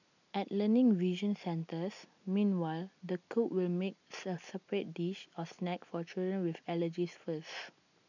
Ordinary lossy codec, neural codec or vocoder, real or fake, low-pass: none; none; real; 7.2 kHz